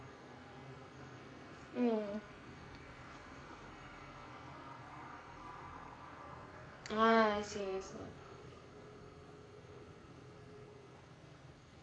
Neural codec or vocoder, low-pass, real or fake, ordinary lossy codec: codec, 44.1 kHz, 2.6 kbps, SNAC; 9.9 kHz; fake; none